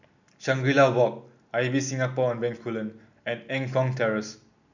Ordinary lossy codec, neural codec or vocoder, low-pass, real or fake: none; none; 7.2 kHz; real